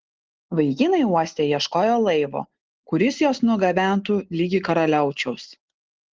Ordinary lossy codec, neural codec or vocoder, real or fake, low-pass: Opus, 16 kbps; none; real; 7.2 kHz